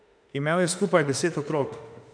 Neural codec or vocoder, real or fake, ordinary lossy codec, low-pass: autoencoder, 48 kHz, 32 numbers a frame, DAC-VAE, trained on Japanese speech; fake; none; 9.9 kHz